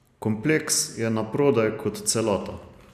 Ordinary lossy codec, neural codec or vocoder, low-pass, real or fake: none; none; 14.4 kHz; real